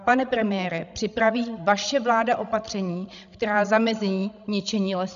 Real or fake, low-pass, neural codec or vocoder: fake; 7.2 kHz; codec, 16 kHz, 16 kbps, FreqCodec, larger model